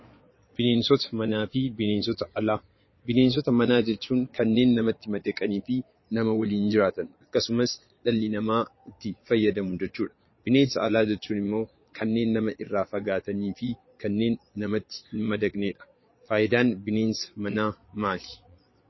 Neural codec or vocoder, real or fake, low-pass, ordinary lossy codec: vocoder, 22.05 kHz, 80 mel bands, Vocos; fake; 7.2 kHz; MP3, 24 kbps